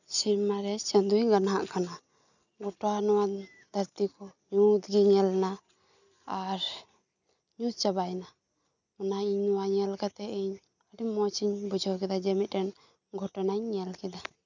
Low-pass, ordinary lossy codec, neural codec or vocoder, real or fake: 7.2 kHz; none; none; real